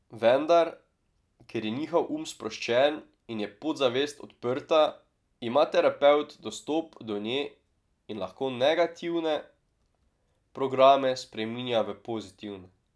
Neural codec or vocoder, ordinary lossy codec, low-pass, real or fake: none; none; none; real